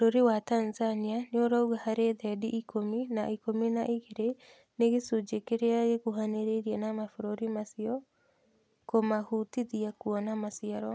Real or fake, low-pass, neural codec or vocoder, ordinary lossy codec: real; none; none; none